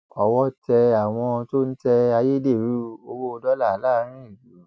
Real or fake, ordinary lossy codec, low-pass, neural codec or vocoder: real; none; none; none